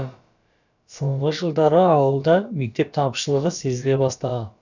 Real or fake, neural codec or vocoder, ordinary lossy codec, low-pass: fake; codec, 16 kHz, about 1 kbps, DyCAST, with the encoder's durations; none; 7.2 kHz